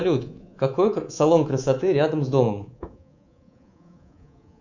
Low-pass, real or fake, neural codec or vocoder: 7.2 kHz; fake; codec, 24 kHz, 3.1 kbps, DualCodec